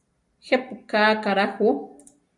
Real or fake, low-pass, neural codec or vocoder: real; 10.8 kHz; none